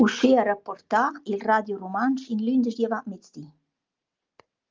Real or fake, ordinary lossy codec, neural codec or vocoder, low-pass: real; Opus, 32 kbps; none; 7.2 kHz